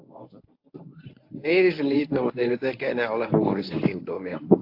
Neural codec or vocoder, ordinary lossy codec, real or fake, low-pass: codec, 24 kHz, 0.9 kbps, WavTokenizer, medium speech release version 1; AAC, 32 kbps; fake; 5.4 kHz